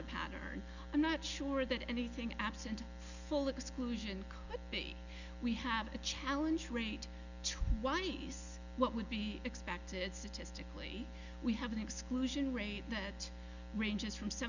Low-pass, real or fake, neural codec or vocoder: 7.2 kHz; real; none